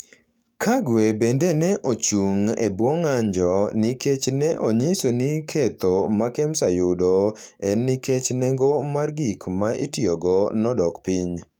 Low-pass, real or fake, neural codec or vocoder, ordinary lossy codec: 19.8 kHz; fake; codec, 44.1 kHz, 7.8 kbps, DAC; none